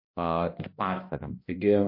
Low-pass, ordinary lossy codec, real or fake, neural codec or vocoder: 5.4 kHz; MP3, 32 kbps; fake; codec, 16 kHz, 1 kbps, X-Codec, HuBERT features, trained on balanced general audio